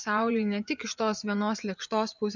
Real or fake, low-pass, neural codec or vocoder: fake; 7.2 kHz; vocoder, 24 kHz, 100 mel bands, Vocos